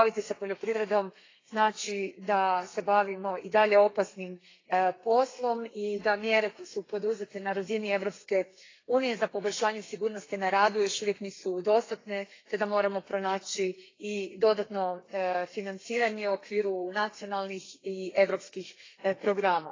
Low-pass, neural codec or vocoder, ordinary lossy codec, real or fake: 7.2 kHz; codec, 32 kHz, 1.9 kbps, SNAC; AAC, 32 kbps; fake